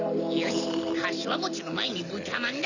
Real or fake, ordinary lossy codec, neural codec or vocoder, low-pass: real; none; none; 7.2 kHz